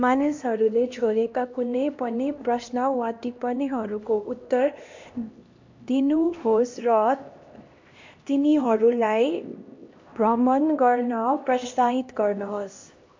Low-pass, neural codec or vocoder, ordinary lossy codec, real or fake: 7.2 kHz; codec, 16 kHz, 1 kbps, X-Codec, HuBERT features, trained on LibriSpeech; MP3, 48 kbps; fake